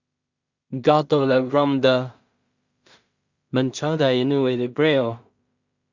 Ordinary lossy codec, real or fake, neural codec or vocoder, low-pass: Opus, 64 kbps; fake; codec, 16 kHz in and 24 kHz out, 0.4 kbps, LongCat-Audio-Codec, two codebook decoder; 7.2 kHz